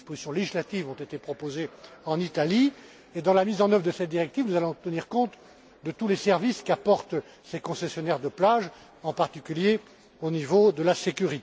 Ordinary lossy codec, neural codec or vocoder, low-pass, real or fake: none; none; none; real